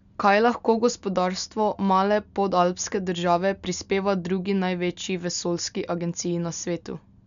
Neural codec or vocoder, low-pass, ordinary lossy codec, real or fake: none; 7.2 kHz; none; real